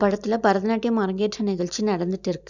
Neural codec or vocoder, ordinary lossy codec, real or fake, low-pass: none; none; real; 7.2 kHz